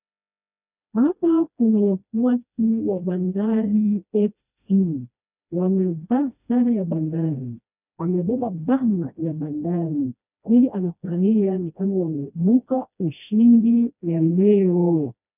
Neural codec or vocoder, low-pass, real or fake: codec, 16 kHz, 1 kbps, FreqCodec, smaller model; 3.6 kHz; fake